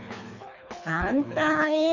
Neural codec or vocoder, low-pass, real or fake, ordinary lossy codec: codec, 24 kHz, 3 kbps, HILCodec; 7.2 kHz; fake; none